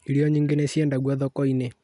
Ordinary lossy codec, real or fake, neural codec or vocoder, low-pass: none; real; none; 10.8 kHz